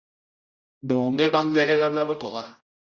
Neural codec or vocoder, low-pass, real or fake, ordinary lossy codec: codec, 16 kHz, 0.5 kbps, X-Codec, HuBERT features, trained on general audio; 7.2 kHz; fake; Opus, 64 kbps